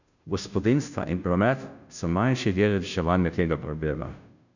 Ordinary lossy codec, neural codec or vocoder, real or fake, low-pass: none; codec, 16 kHz, 0.5 kbps, FunCodec, trained on Chinese and English, 25 frames a second; fake; 7.2 kHz